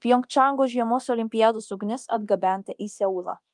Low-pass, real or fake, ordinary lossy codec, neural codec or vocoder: 10.8 kHz; fake; Opus, 32 kbps; codec, 24 kHz, 0.9 kbps, DualCodec